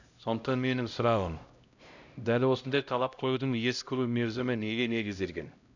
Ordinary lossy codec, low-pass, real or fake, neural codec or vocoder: none; 7.2 kHz; fake; codec, 16 kHz, 0.5 kbps, X-Codec, HuBERT features, trained on LibriSpeech